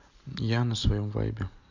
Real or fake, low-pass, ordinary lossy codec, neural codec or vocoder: real; 7.2 kHz; AAC, 48 kbps; none